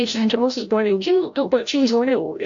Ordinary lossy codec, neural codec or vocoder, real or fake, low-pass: AAC, 64 kbps; codec, 16 kHz, 0.5 kbps, FreqCodec, larger model; fake; 7.2 kHz